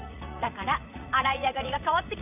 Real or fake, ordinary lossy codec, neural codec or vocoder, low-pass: fake; none; vocoder, 44.1 kHz, 80 mel bands, Vocos; 3.6 kHz